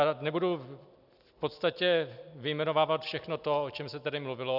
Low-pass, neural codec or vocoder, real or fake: 5.4 kHz; none; real